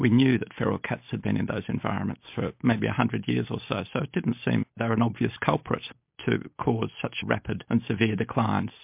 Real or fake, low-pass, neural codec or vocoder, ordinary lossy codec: real; 3.6 kHz; none; MP3, 32 kbps